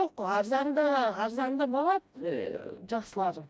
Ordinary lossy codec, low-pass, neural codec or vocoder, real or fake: none; none; codec, 16 kHz, 1 kbps, FreqCodec, smaller model; fake